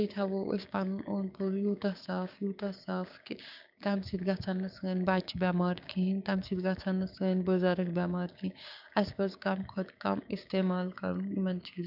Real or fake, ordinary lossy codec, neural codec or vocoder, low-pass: fake; none; codec, 16 kHz, 6 kbps, DAC; 5.4 kHz